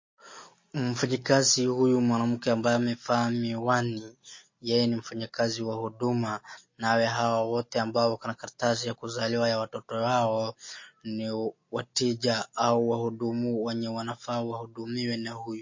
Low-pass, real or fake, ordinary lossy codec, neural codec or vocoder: 7.2 kHz; real; MP3, 32 kbps; none